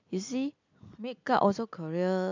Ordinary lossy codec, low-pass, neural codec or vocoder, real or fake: MP3, 64 kbps; 7.2 kHz; none; real